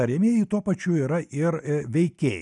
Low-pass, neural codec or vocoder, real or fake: 10.8 kHz; none; real